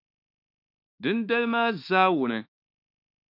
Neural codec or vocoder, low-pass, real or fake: autoencoder, 48 kHz, 32 numbers a frame, DAC-VAE, trained on Japanese speech; 5.4 kHz; fake